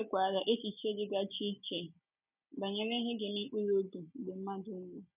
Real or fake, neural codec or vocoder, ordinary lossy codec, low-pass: real; none; none; 3.6 kHz